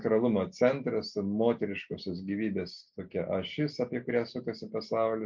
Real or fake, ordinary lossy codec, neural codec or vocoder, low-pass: real; MP3, 48 kbps; none; 7.2 kHz